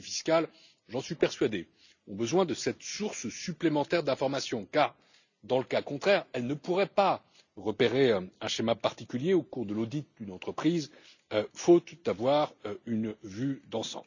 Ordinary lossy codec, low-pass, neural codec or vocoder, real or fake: none; 7.2 kHz; none; real